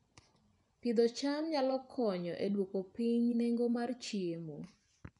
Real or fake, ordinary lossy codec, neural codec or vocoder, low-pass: fake; none; vocoder, 24 kHz, 100 mel bands, Vocos; 10.8 kHz